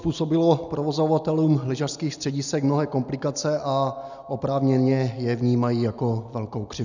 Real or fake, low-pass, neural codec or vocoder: real; 7.2 kHz; none